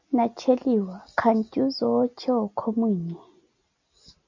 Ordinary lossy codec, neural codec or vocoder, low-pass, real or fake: MP3, 64 kbps; none; 7.2 kHz; real